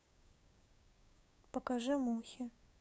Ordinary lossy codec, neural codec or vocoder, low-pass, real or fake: none; codec, 16 kHz, 6 kbps, DAC; none; fake